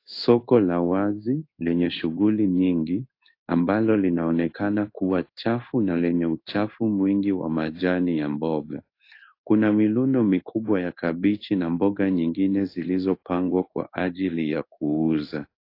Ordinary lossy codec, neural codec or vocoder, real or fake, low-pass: AAC, 32 kbps; codec, 16 kHz in and 24 kHz out, 1 kbps, XY-Tokenizer; fake; 5.4 kHz